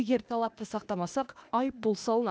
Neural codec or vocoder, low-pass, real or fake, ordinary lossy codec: codec, 16 kHz, 0.8 kbps, ZipCodec; none; fake; none